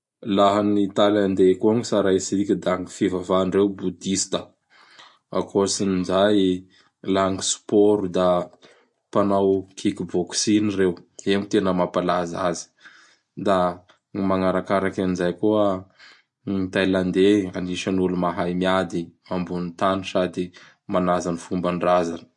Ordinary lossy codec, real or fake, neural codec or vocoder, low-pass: MP3, 48 kbps; real; none; 10.8 kHz